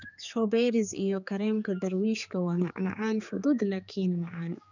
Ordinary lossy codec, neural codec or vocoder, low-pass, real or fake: none; codec, 16 kHz, 4 kbps, X-Codec, HuBERT features, trained on general audio; 7.2 kHz; fake